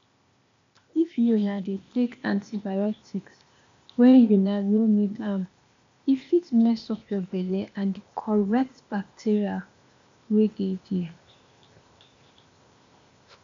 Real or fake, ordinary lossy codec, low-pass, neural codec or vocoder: fake; MP3, 64 kbps; 7.2 kHz; codec, 16 kHz, 0.8 kbps, ZipCodec